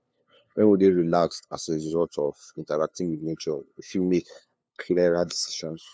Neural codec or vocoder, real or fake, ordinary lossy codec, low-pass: codec, 16 kHz, 2 kbps, FunCodec, trained on LibriTTS, 25 frames a second; fake; none; none